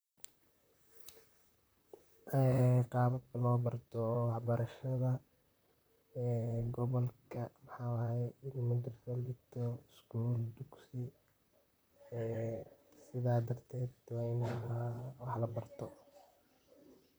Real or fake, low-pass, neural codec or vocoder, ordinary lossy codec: fake; none; vocoder, 44.1 kHz, 128 mel bands, Pupu-Vocoder; none